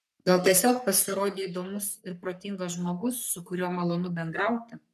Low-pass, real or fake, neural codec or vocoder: 14.4 kHz; fake; codec, 44.1 kHz, 3.4 kbps, Pupu-Codec